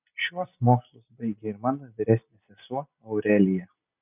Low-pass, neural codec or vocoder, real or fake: 3.6 kHz; none; real